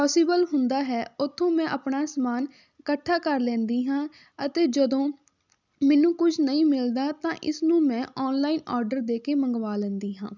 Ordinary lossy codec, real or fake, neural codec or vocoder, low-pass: none; real; none; 7.2 kHz